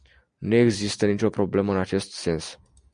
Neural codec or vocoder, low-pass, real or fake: none; 9.9 kHz; real